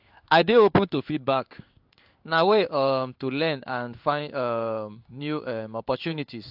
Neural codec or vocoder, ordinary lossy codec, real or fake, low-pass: codec, 16 kHz in and 24 kHz out, 1 kbps, XY-Tokenizer; AAC, 48 kbps; fake; 5.4 kHz